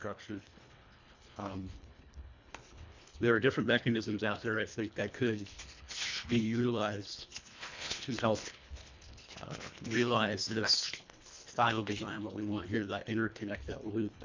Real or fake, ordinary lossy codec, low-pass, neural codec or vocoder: fake; MP3, 64 kbps; 7.2 kHz; codec, 24 kHz, 1.5 kbps, HILCodec